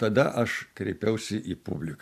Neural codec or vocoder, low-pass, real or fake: vocoder, 44.1 kHz, 128 mel bands every 256 samples, BigVGAN v2; 14.4 kHz; fake